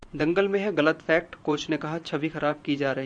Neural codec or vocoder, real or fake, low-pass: vocoder, 24 kHz, 100 mel bands, Vocos; fake; 9.9 kHz